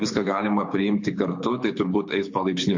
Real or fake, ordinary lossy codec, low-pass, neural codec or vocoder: fake; MP3, 48 kbps; 7.2 kHz; codec, 24 kHz, 6 kbps, HILCodec